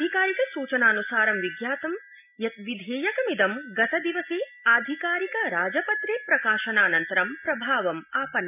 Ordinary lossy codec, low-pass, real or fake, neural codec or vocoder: none; 3.6 kHz; real; none